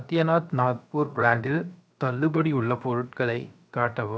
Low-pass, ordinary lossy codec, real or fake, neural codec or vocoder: none; none; fake; codec, 16 kHz, 0.7 kbps, FocalCodec